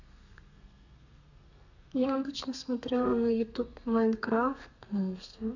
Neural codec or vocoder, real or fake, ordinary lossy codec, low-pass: codec, 32 kHz, 1.9 kbps, SNAC; fake; none; 7.2 kHz